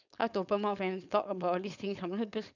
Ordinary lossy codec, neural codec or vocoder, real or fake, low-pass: none; codec, 16 kHz, 4.8 kbps, FACodec; fake; 7.2 kHz